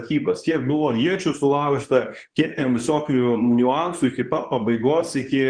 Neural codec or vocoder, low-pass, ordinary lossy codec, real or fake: codec, 24 kHz, 0.9 kbps, WavTokenizer, medium speech release version 2; 9.9 kHz; Opus, 24 kbps; fake